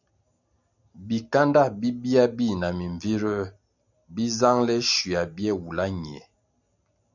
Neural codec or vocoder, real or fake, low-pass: none; real; 7.2 kHz